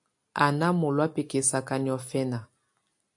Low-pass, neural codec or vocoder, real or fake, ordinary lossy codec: 10.8 kHz; none; real; AAC, 64 kbps